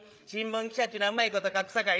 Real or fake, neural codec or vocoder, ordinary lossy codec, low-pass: fake; codec, 16 kHz, 8 kbps, FreqCodec, larger model; none; none